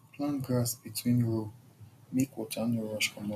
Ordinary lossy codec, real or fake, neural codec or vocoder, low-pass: none; real; none; 14.4 kHz